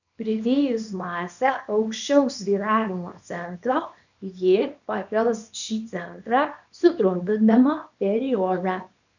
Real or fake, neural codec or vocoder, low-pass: fake; codec, 24 kHz, 0.9 kbps, WavTokenizer, small release; 7.2 kHz